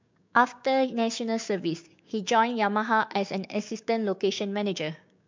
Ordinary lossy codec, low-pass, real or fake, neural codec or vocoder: MP3, 64 kbps; 7.2 kHz; fake; codec, 16 kHz, 6 kbps, DAC